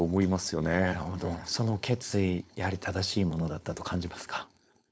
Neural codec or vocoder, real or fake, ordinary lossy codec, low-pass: codec, 16 kHz, 4.8 kbps, FACodec; fake; none; none